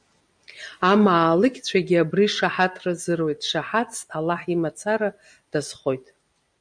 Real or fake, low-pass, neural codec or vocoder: real; 9.9 kHz; none